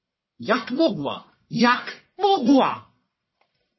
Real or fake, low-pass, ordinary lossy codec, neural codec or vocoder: fake; 7.2 kHz; MP3, 24 kbps; codec, 44.1 kHz, 1.7 kbps, Pupu-Codec